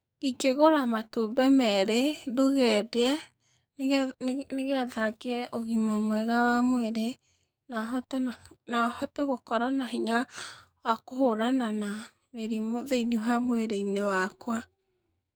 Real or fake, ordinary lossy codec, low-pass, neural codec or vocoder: fake; none; none; codec, 44.1 kHz, 2.6 kbps, SNAC